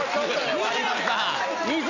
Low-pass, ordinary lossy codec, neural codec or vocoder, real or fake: 7.2 kHz; Opus, 64 kbps; none; real